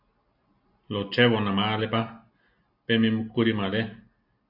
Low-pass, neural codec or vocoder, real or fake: 5.4 kHz; none; real